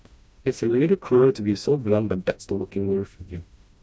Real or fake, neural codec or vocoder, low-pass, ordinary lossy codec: fake; codec, 16 kHz, 1 kbps, FreqCodec, smaller model; none; none